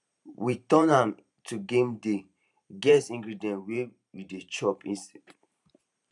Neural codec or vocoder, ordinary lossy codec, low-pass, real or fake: vocoder, 44.1 kHz, 128 mel bands every 512 samples, BigVGAN v2; none; 10.8 kHz; fake